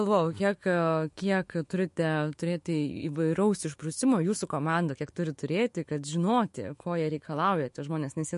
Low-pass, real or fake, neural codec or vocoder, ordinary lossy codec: 14.4 kHz; fake; autoencoder, 48 kHz, 128 numbers a frame, DAC-VAE, trained on Japanese speech; MP3, 48 kbps